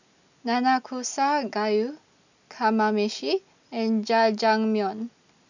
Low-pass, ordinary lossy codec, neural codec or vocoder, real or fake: 7.2 kHz; none; none; real